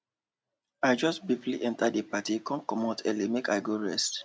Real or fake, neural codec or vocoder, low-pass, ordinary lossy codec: real; none; none; none